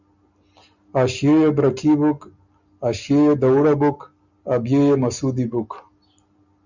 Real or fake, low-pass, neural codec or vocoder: real; 7.2 kHz; none